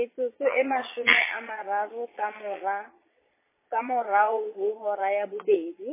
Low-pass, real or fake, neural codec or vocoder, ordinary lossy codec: 3.6 kHz; fake; vocoder, 22.05 kHz, 80 mel bands, Vocos; MP3, 16 kbps